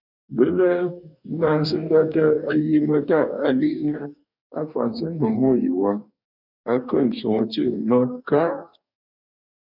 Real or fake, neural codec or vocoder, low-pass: fake; codec, 44.1 kHz, 2.6 kbps, DAC; 5.4 kHz